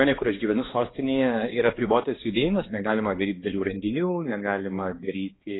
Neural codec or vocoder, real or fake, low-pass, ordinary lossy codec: codec, 16 kHz, 2 kbps, X-Codec, HuBERT features, trained on balanced general audio; fake; 7.2 kHz; AAC, 16 kbps